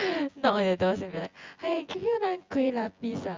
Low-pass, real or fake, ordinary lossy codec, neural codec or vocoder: 7.2 kHz; fake; Opus, 32 kbps; vocoder, 24 kHz, 100 mel bands, Vocos